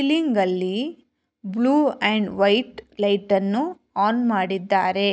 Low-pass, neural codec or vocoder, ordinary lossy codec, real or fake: none; none; none; real